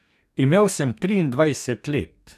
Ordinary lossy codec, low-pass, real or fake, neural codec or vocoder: none; 14.4 kHz; fake; codec, 44.1 kHz, 2.6 kbps, DAC